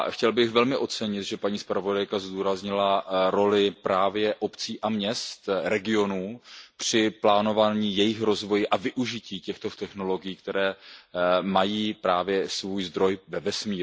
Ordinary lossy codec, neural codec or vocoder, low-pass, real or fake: none; none; none; real